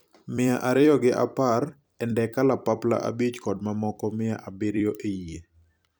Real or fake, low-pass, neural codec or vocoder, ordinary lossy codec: fake; none; vocoder, 44.1 kHz, 128 mel bands every 256 samples, BigVGAN v2; none